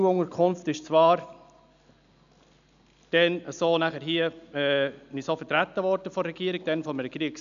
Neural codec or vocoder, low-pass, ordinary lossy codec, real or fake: none; 7.2 kHz; none; real